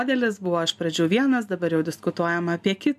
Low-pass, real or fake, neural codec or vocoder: 14.4 kHz; real; none